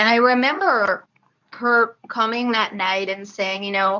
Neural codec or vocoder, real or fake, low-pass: codec, 24 kHz, 0.9 kbps, WavTokenizer, medium speech release version 2; fake; 7.2 kHz